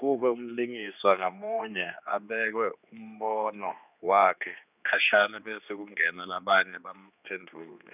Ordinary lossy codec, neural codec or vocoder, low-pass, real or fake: none; codec, 16 kHz, 2 kbps, X-Codec, HuBERT features, trained on general audio; 3.6 kHz; fake